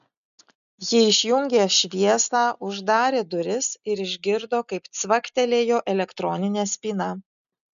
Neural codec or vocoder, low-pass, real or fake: none; 7.2 kHz; real